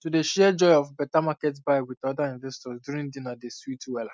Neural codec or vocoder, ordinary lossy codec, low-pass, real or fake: none; none; none; real